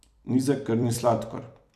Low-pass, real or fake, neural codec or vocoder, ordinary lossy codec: 14.4 kHz; fake; vocoder, 44.1 kHz, 128 mel bands every 256 samples, BigVGAN v2; none